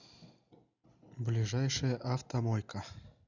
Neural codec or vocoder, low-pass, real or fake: none; 7.2 kHz; real